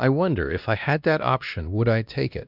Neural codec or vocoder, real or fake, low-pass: codec, 16 kHz, 1 kbps, X-Codec, WavLM features, trained on Multilingual LibriSpeech; fake; 5.4 kHz